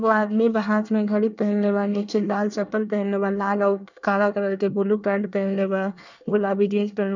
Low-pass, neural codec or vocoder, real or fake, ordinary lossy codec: 7.2 kHz; codec, 24 kHz, 1 kbps, SNAC; fake; none